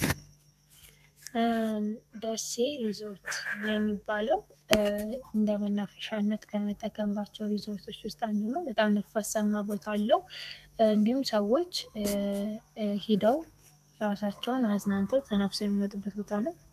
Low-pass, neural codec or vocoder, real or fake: 14.4 kHz; codec, 32 kHz, 1.9 kbps, SNAC; fake